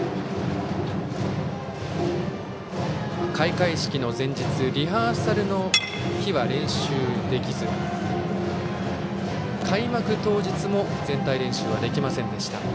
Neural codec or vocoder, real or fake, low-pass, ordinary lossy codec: none; real; none; none